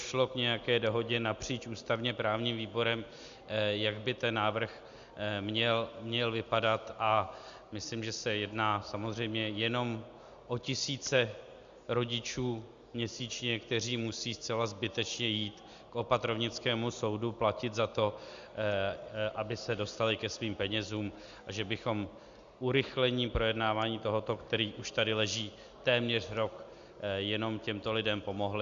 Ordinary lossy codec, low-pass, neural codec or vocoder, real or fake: Opus, 64 kbps; 7.2 kHz; none; real